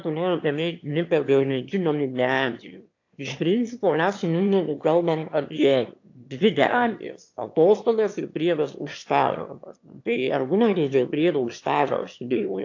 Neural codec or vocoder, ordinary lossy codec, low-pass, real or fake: autoencoder, 22.05 kHz, a latent of 192 numbers a frame, VITS, trained on one speaker; AAC, 48 kbps; 7.2 kHz; fake